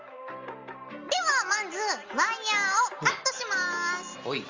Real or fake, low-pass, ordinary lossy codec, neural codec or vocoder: real; 7.2 kHz; Opus, 32 kbps; none